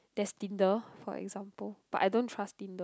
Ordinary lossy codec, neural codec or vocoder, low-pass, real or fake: none; none; none; real